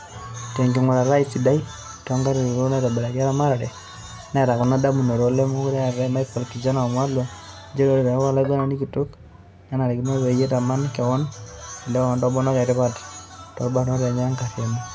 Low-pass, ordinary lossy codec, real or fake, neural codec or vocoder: none; none; real; none